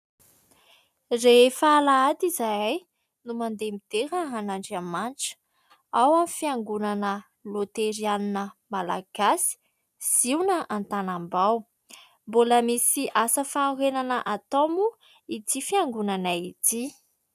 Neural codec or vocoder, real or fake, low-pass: none; real; 14.4 kHz